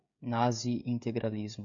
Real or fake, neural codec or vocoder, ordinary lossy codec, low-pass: fake; codec, 16 kHz, 16 kbps, FreqCodec, smaller model; MP3, 96 kbps; 7.2 kHz